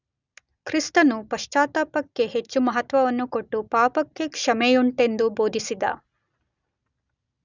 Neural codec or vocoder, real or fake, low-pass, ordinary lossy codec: none; real; 7.2 kHz; none